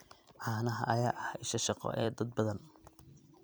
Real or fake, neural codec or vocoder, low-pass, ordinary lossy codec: fake; vocoder, 44.1 kHz, 128 mel bands every 256 samples, BigVGAN v2; none; none